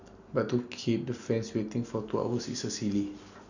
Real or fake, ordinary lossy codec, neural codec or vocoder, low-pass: real; none; none; 7.2 kHz